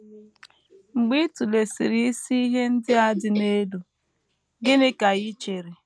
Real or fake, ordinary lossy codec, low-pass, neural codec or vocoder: real; none; none; none